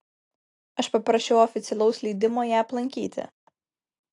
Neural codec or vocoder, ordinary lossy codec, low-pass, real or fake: none; AAC, 48 kbps; 10.8 kHz; real